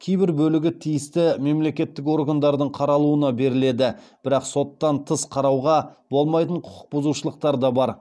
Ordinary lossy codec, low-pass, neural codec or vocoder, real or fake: none; none; none; real